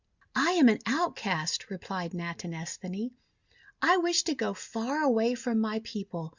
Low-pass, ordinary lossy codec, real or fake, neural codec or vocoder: 7.2 kHz; Opus, 64 kbps; real; none